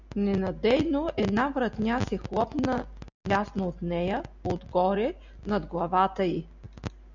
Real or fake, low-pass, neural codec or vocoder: real; 7.2 kHz; none